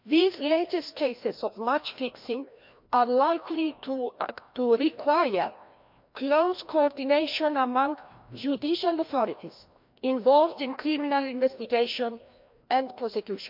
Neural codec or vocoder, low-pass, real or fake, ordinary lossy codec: codec, 16 kHz, 1 kbps, FreqCodec, larger model; 5.4 kHz; fake; MP3, 48 kbps